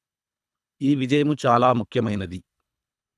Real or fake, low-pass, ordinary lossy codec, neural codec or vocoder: fake; none; none; codec, 24 kHz, 3 kbps, HILCodec